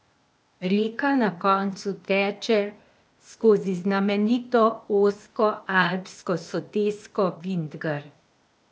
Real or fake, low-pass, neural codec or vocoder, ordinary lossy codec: fake; none; codec, 16 kHz, 0.8 kbps, ZipCodec; none